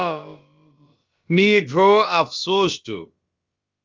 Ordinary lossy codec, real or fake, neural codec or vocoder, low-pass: Opus, 32 kbps; fake; codec, 16 kHz, about 1 kbps, DyCAST, with the encoder's durations; 7.2 kHz